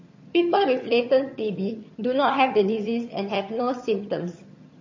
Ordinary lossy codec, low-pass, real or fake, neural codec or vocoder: MP3, 32 kbps; 7.2 kHz; fake; vocoder, 22.05 kHz, 80 mel bands, HiFi-GAN